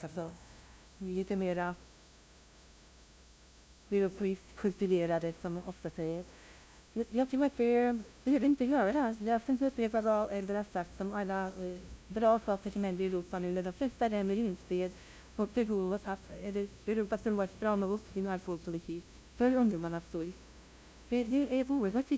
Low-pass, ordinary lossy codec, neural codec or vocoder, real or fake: none; none; codec, 16 kHz, 0.5 kbps, FunCodec, trained on LibriTTS, 25 frames a second; fake